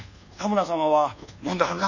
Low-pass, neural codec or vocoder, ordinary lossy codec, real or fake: 7.2 kHz; codec, 24 kHz, 1.2 kbps, DualCodec; none; fake